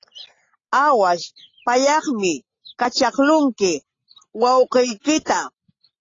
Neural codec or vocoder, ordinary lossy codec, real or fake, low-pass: none; AAC, 32 kbps; real; 7.2 kHz